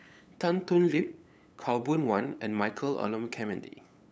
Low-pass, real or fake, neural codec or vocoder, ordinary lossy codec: none; fake; codec, 16 kHz, 8 kbps, FunCodec, trained on LibriTTS, 25 frames a second; none